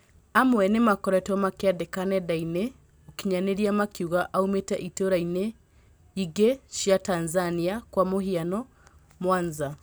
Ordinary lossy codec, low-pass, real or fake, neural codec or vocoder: none; none; real; none